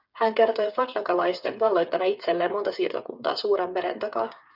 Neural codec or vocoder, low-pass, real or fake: codec, 16 kHz, 8 kbps, FreqCodec, smaller model; 5.4 kHz; fake